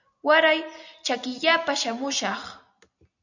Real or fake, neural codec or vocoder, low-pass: real; none; 7.2 kHz